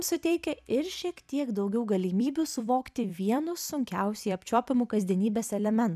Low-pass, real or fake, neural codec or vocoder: 14.4 kHz; fake; vocoder, 44.1 kHz, 128 mel bands every 256 samples, BigVGAN v2